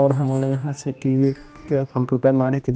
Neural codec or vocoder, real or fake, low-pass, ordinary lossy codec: codec, 16 kHz, 1 kbps, X-Codec, HuBERT features, trained on general audio; fake; none; none